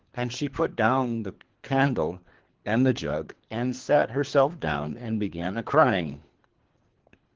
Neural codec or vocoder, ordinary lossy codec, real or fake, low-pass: codec, 24 kHz, 3 kbps, HILCodec; Opus, 16 kbps; fake; 7.2 kHz